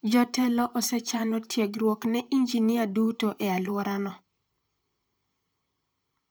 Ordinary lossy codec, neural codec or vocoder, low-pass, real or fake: none; vocoder, 44.1 kHz, 128 mel bands, Pupu-Vocoder; none; fake